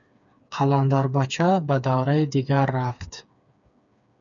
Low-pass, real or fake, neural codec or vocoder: 7.2 kHz; fake; codec, 16 kHz, 4 kbps, FreqCodec, smaller model